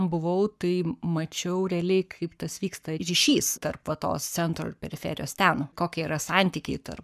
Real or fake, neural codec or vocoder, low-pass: fake; codec, 44.1 kHz, 7.8 kbps, Pupu-Codec; 14.4 kHz